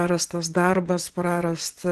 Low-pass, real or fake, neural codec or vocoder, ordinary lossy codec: 10.8 kHz; fake; vocoder, 24 kHz, 100 mel bands, Vocos; Opus, 16 kbps